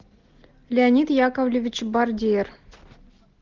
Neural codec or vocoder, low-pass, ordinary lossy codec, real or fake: none; 7.2 kHz; Opus, 16 kbps; real